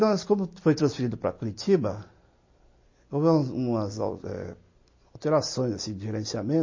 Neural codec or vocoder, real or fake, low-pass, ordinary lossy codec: none; real; 7.2 kHz; MP3, 32 kbps